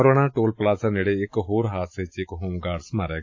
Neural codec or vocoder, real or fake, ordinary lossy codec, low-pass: none; real; AAC, 48 kbps; 7.2 kHz